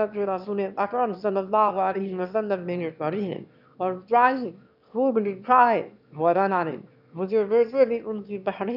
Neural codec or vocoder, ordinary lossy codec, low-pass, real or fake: autoencoder, 22.05 kHz, a latent of 192 numbers a frame, VITS, trained on one speaker; none; 5.4 kHz; fake